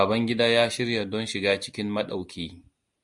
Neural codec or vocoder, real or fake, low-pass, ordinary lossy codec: none; real; 10.8 kHz; Opus, 64 kbps